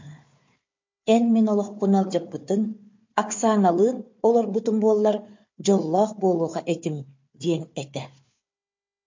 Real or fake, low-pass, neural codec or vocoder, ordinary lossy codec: fake; 7.2 kHz; codec, 16 kHz, 4 kbps, FunCodec, trained on Chinese and English, 50 frames a second; MP3, 48 kbps